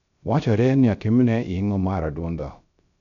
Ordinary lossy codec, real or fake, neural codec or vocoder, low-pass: none; fake; codec, 16 kHz, 0.3 kbps, FocalCodec; 7.2 kHz